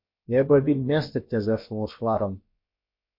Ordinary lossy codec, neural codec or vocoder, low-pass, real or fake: MP3, 32 kbps; codec, 16 kHz, about 1 kbps, DyCAST, with the encoder's durations; 5.4 kHz; fake